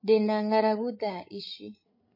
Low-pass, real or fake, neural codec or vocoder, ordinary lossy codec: 5.4 kHz; fake; codec, 16 kHz, 16 kbps, FreqCodec, larger model; MP3, 24 kbps